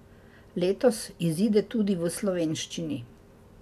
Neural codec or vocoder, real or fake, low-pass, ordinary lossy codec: none; real; 14.4 kHz; none